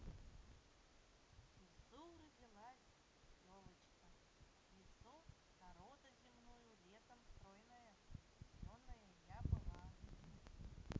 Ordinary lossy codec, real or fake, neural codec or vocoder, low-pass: none; real; none; none